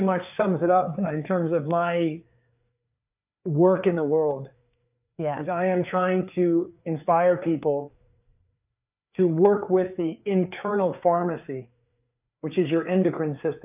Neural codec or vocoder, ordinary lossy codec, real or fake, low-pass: codec, 16 kHz, 4 kbps, FreqCodec, larger model; AAC, 32 kbps; fake; 3.6 kHz